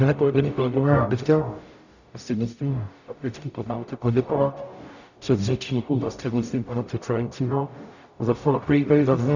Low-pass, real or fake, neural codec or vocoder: 7.2 kHz; fake; codec, 44.1 kHz, 0.9 kbps, DAC